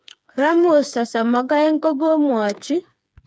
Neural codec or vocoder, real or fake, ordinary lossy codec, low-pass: codec, 16 kHz, 4 kbps, FreqCodec, smaller model; fake; none; none